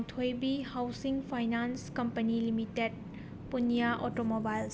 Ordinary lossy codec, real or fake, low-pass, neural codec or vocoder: none; real; none; none